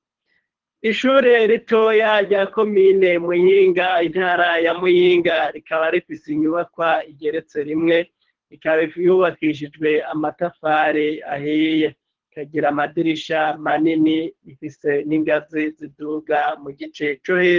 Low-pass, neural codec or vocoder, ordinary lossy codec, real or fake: 7.2 kHz; codec, 24 kHz, 3 kbps, HILCodec; Opus, 16 kbps; fake